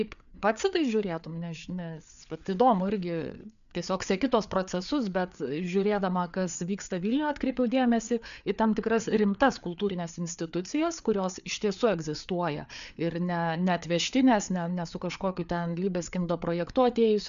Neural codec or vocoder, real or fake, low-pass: codec, 16 kHz, 4 kbps, FreqCodec, larger model; fake; 7.2 kHz